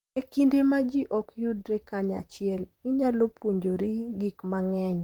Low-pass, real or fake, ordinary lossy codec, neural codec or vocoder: 19.8 kHz; fake; Opus, 32 kbps; vocoder, 44.1 kHz, 128 mel bands, Pupu-Vocoder